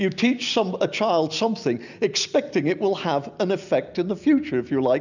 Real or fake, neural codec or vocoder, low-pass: real; none; 7.2 kHz